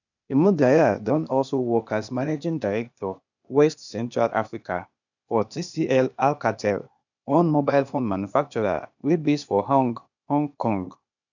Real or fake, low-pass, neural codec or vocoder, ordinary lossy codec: fake; 7.2 kHz; codec, 16 kHz, 0.8 kbps, ZipCodec; none